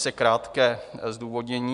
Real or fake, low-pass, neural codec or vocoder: real; 10.8 kHz; none